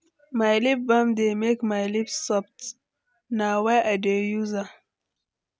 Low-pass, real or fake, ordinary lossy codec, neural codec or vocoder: none; real; none; none